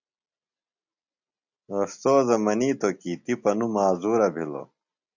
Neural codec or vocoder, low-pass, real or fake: none; 7.2 kHz; real